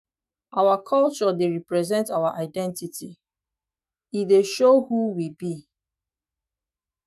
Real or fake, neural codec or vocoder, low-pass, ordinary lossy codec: fake; autoencoder, 48 kHz, 128 numbers a frame, DAC-VAE, trained on Japanese speech; 14.4 kHz; none